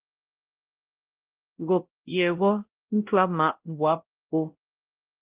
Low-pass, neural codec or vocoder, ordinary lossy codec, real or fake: 3.6 kHz; codec, 16 kHz, 0.5 kbps, X-Codec, WavLM features, trained on Multilingual LibriSpeech; Opus, 16 kbps; fake